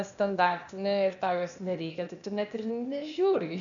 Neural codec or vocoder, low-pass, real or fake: codec, 16 kHz, 0.8 kbps, ZipCodec; 7.2 kHz; fake